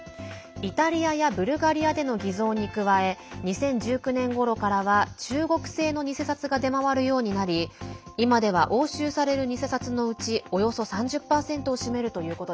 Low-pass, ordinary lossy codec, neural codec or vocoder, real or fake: none; none; none; real